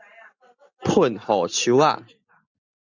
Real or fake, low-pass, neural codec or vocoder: real; 7.2 kHz; none